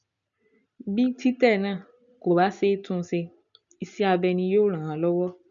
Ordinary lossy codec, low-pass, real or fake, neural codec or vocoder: none; 7.2 kHz; real; none